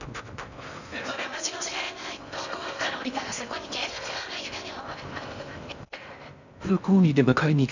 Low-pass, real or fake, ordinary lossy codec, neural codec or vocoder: 7.2 kHz; fake; none; codec, 16 kHz in and 24 kHz out, 0.6 kbps, FocalCodec, streaming, 4096 codes